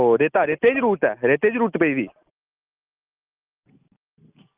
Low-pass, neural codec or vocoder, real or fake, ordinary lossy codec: 3.6 kHz; none; real; Opus, 32 kbps